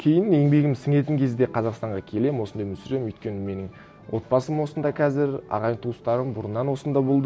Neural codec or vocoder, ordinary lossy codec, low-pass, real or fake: none; none; none; real